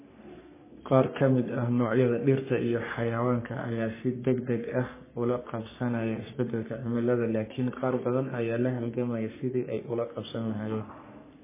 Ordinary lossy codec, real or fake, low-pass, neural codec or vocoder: MP3, 16 kbps; fake; 3.6 kHz; codec, 44.1 kHz, 3.4 kbps, Pupu-Codec